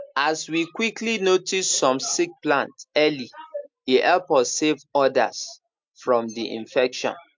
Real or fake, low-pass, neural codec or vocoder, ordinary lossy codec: real; 7.2 kHz; none; MP3, 64 kbps